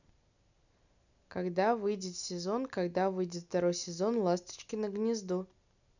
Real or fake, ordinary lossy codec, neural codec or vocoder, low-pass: real; none; none; 7.2 kHz